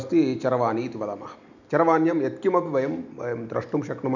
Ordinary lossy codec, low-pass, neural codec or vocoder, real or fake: none; 7.2 kHz; none; real